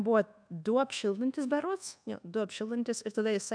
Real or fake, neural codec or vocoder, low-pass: fake; codec, 24 kHz, 1.2 kbps, DualCodec; 9.9 kHz